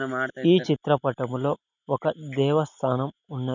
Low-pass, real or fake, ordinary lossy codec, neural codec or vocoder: 7.2 kHz; real; none; none